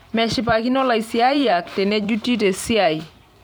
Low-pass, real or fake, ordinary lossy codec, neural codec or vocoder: none; fake; none; vocoder, 44.1 kHz, 128 mel bands every 512 samples, BigVGAN v2